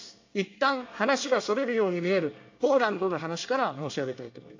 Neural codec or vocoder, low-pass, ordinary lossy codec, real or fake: codec, 24 kHz, 1 kbps, SNAC; 7.2 kHz; AAC, 48 kbps; fake